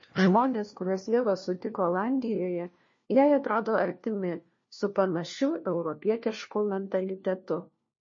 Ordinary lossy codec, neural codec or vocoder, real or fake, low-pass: MP3, 32 kbps; codec, 16 kHz, 1 kbps, FunCodec, trained on LibriTTS, 50 frames a second; fake; 7.2 kHz